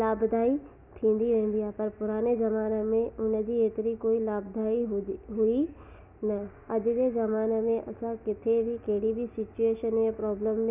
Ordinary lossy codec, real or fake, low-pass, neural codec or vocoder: none; real; 3.6 kHz; none